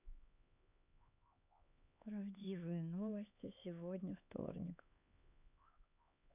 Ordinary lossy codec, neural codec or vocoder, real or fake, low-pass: none; codec, 16 kHz, 4 kbps, X-Codec, HuBERT features, trained on LibriSpeech; fake; 3.6 kHz